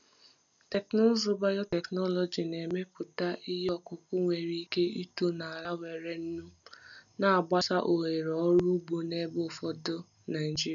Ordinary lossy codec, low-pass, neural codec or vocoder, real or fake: none; 7.2 kHz; none; real